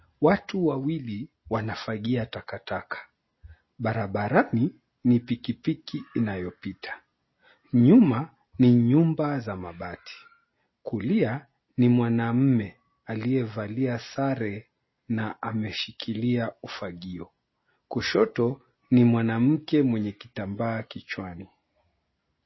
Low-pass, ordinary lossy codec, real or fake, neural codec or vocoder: 7.2 kHz; MP3, 24 kbps; real; none